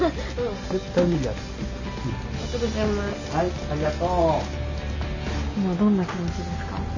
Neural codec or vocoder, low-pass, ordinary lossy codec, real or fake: none; 7.2 kHz; none; real